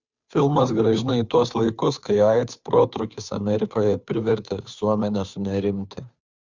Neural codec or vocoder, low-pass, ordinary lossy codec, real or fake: codec, 16 kHz, 2 kbps, FunCodec, trained on Chinese and English, 25 frames a second; 7.2 kHz; Opus, 64 kbps; fake